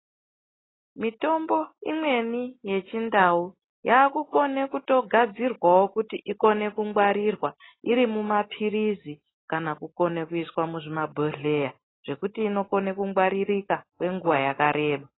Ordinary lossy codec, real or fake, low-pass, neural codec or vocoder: AAC, 16 kbps; real; 7.2 kHz; none